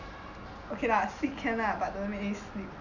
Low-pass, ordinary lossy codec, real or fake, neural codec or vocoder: 7.2 kHz; none; real; none